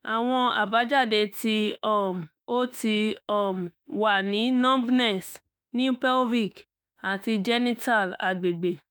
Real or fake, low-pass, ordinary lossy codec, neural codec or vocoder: fake; none; none; autoencoder, 48 kHz, 32 numbers a frame, DAC-VAE, trained on Japanese speech